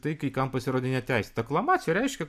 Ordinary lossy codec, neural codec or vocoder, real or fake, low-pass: MP3, 96 kbps; none; real; 14.4 kHz